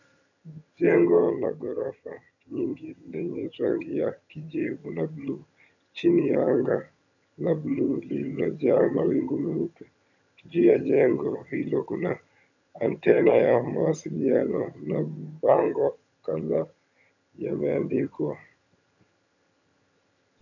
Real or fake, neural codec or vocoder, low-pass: fake; vocoder, 22.05 kHz, 80 mel bands, HiFi-GAN; 7.2 kHz